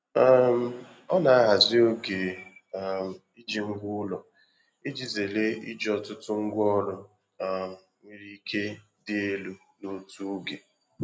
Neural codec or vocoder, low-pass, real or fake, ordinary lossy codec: none; none; real; none